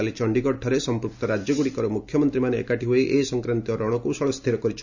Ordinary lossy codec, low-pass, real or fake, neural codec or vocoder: none; 7.2 kHz; real; none